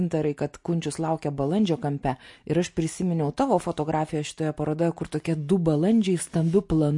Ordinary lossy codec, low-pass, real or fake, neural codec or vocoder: MP3, 48 kbps; 10.8 kHz; real; none